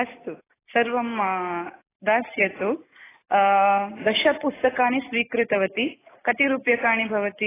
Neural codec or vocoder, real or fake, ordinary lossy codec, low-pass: none; real; AAC, 16 kbps; 3.6 kHz